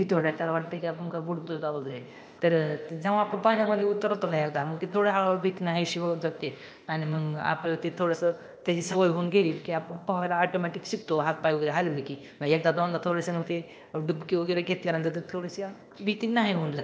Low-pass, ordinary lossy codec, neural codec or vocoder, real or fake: none; none; codec, 16 kHz, 0.8 kbps, ZipCodec; fake